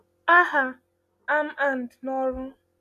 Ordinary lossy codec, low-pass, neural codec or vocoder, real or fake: none; 14.4 kHz; none; real